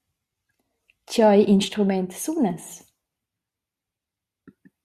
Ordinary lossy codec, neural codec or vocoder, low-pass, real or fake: Opus, 64 kbps; none; 14.4 kHz; real